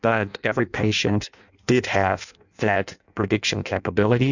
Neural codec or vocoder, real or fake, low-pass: codec, 16 kHz in and 24 kHz out, 0.6 kbps, FireRedTTS-2 codec; fake; 7.2 kHz